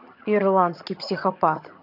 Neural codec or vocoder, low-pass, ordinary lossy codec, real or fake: vocoder, 22.05 kHz, 80 mel bands, HiFi-GAN; 5.4 kHz; none; fake